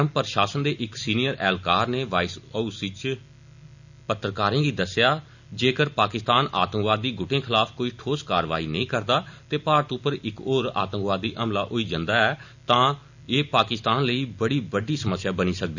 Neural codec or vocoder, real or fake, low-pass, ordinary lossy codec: none; real; 7.2 kHz; none